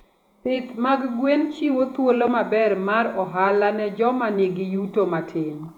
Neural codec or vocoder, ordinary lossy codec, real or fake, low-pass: vocoder, 48 kHz, 128 mel bands, Vocos; none; fake; 19.8 kHz